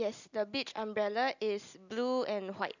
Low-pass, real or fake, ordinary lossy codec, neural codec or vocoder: 7.2 kHz; real; none; none